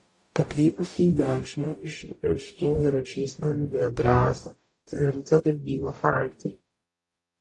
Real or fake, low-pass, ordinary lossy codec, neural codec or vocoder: fake; 10.8 kHz; AAC, 48 kbps; codec, 44.1 kHz, 0.9 kbps, DAC